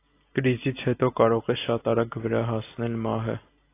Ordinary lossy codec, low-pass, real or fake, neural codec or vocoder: AAC, 24 kbps; 3.6 kHz; real; none